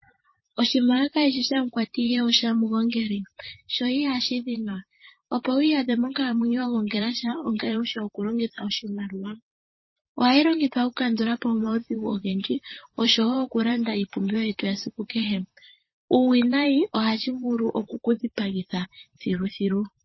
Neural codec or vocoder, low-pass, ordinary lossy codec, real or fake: vocoder, 44.1 kHz, 128 mel bands, Pupu-Vocoder; 7.2 kHz; MP3, 24 kbps; fake